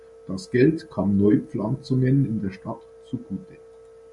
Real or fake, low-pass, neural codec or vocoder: real; 10.8 kHz; none